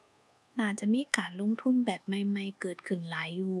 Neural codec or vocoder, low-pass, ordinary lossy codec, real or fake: codec, 24 kHz, 1.2 kbps, DualCodec; none; none; fake